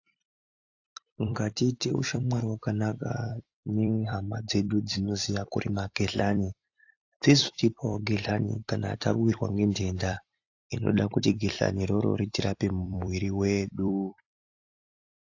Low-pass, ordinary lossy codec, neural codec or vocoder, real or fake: 7.2 kHz; AAC, 48 kbps; vocoder, 44.1 kHz, 128 mel bands every 256 samples, BigVGAN v2; fake